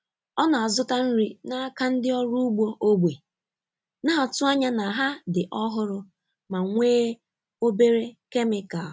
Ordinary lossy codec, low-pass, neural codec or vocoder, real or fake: none; none; none; real